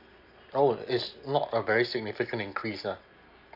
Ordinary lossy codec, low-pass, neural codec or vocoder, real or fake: none; 5.4 kHz; none; real